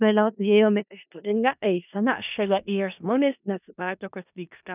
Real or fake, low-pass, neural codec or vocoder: fake; 3.6 kHz; codec, 16 kHz in and 24 kHz out, 0.4 kbps, LongCat-Audio-Codec, four codebook decoder